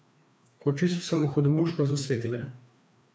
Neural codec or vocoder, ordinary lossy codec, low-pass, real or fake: codec, 16 kHz, 2 kbps, FreqCodec, larger model; none; none; fake